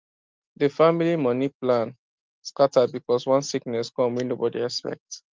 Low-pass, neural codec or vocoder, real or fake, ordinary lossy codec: 7.2 kHz; none; real; Opus, 32 kbps